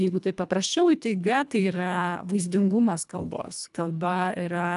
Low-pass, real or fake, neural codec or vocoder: 10.8 kHz; fake; codec, 24 kHz, 1.5 kbps, HILCodec